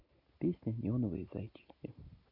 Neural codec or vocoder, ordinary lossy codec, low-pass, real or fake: none; none; 5.4 kHz; real